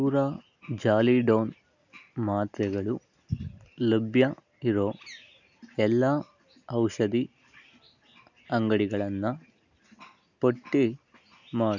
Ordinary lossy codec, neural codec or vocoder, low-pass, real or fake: none; none; 7.2 kHz; real